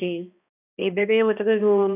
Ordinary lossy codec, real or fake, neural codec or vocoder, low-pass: none; fake; codec, 16 kHz, 1 kbps, X-Codec, HuBERT features, trained on balanced general audio; 3.6 kHz